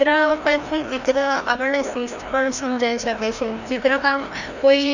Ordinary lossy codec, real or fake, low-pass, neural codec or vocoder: none; fake; 7.2 kHz; codec, 16 kHz, 1 kbps, FreqCodec, larger model